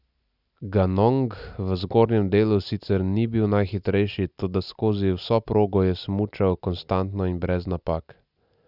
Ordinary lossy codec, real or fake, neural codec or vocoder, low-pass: none; real; none; 5.4 kHz